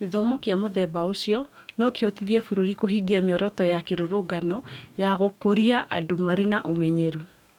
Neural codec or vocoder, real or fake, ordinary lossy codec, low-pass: codec, 44.1 kHz, 2.6 kbps, DAC; fake; none; 19.8 kHz